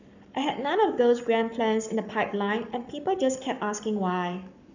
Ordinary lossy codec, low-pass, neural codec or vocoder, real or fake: none; 7.2 kHz; codec, 44.1 kHz, 7.8 kbps, Pupu-Codec; fake